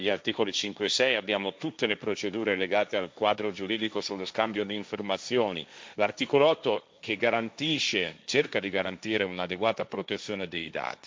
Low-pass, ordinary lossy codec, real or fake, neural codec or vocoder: none; none; fake; codec, 16 kHz, 1.1 kbps, Voila-Tokenizer